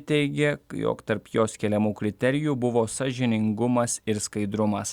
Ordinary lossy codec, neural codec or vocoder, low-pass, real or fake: Opus, 64 kbps; none; 19.8 kHz; real